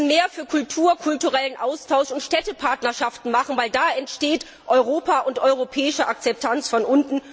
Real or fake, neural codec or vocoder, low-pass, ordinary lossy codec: real; none; none; none